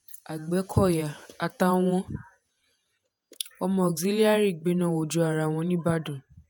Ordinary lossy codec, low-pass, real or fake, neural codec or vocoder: none; none; fake; vocoder, 48 kHz, 128 mel bands, Vocos